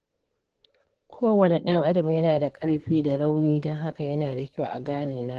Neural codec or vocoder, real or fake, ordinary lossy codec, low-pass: codec, 24 kHz, 1 kbps, SNAC; fake; Opus, 16 kbps; 10.8 kHz